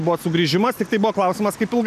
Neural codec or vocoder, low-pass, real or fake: none; 14.4 kHz; real